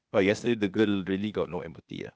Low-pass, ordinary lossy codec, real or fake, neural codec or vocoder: none; none; fake; codec, 16 kHz, 0.8 kbps, ZipCodec